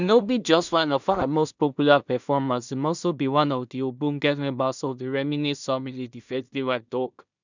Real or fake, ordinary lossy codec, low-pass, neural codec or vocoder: fake; none; 7.2 kHz; codec, 16 kHz in and 24 kHz out, 0.4 kbps, LongCat-Audio-Codec, two codebook decoder